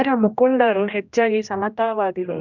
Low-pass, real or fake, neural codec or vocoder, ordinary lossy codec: 7.2 kHz; fake; codec, 16 kHz, 1 kbps, X-Codec, HuBERT features, trained on general audio; none